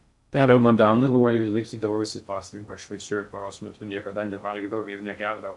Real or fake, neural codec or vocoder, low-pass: fake; codec, 16 kHz in and 24 kHz out, 0.6 kbps, FocalCodec, streaming, 2048 codes; 10.8 kHz